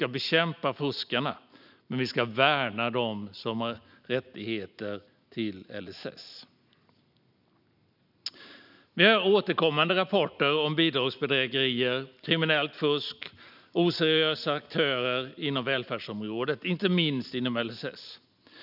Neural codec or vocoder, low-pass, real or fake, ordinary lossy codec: none; 5.4 kHz; real; none